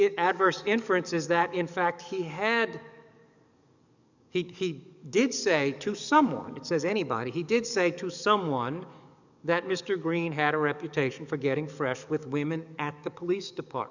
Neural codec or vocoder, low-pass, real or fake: codec, 44.1 kHz, 7.8 kbps, DAC; 7.2 kHz; fake